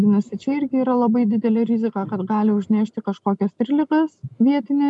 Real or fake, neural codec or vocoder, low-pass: real; none; 10.8 kHz